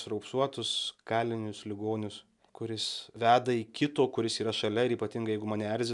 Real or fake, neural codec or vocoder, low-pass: real; none; 10.8 kHz